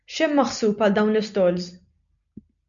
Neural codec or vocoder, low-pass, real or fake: none; 7.2 kHz; real